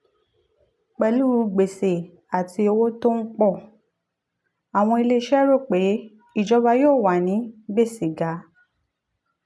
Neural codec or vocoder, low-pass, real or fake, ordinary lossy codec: none; none; real; none